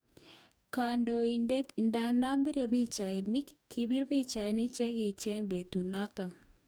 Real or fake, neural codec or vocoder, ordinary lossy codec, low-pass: fake; codec, 44.1 kHz, 2.6 kbps, DAC; none; none